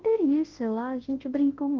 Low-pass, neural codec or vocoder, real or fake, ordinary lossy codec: 7.2 kHz; codec, 24 kHz, 0.9 kbps, WavTokenizer, large speech release; fake; Opus, 16 kbps